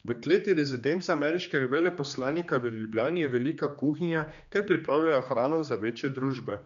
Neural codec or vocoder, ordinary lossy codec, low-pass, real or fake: codec, 16 kHz, 2 kbps, X-Codec, HuBERT features, trained on general audio; none; 7.2 kHz; fake